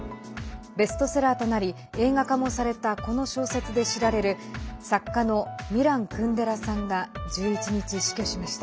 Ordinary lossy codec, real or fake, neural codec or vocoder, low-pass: none; real; none; none